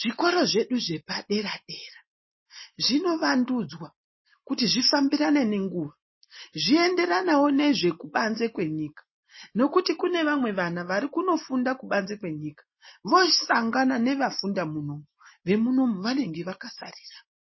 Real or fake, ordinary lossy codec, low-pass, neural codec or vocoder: real; MP3, 24 kbps; 7.2 kHz; none